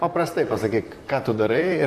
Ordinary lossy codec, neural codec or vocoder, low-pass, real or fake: MP3, 64 kbps; vocoder, 44.1 kHz, 128 mel bands, Pupu-Vocoder; 14.4 kHz; fake